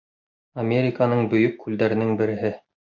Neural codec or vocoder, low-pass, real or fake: none; 7.2 kHz; real